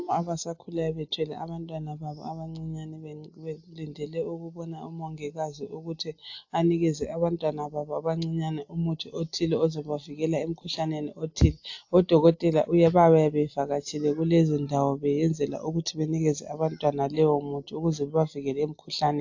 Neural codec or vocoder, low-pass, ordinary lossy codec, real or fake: none; 7.2 kHz; AAC, 48 kbps; real